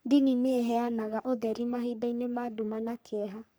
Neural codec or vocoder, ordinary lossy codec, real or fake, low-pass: codec, 44.1 kHz, 3.4 kbps, Pupu-Codec; none; fake; none